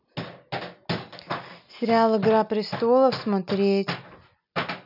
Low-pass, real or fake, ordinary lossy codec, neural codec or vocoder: 5.4 kHz; real; none; none